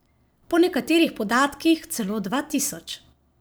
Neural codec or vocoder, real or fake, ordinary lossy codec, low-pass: vocoder, 44.1 kHz, 128 mel bands every 512 samples, BigVGAN v2; fake; none; none